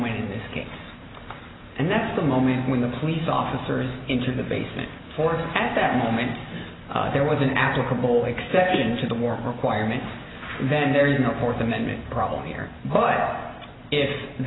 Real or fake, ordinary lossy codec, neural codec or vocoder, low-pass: real; AAC, 16 kbps; none; 7.2 kHz